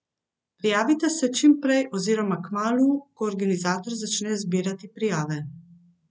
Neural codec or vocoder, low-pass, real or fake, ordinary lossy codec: none; none; real; none